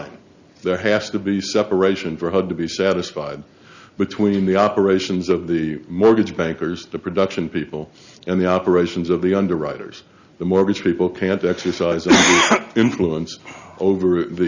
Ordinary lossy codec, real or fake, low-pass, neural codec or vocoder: Opus, 64 kbps; real; 7.2 kHz; none